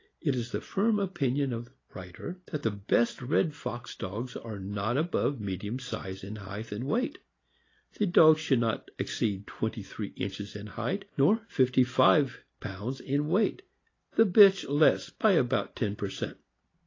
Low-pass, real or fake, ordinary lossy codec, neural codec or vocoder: 7.2 kHz; real; AAC, 32 kbps; none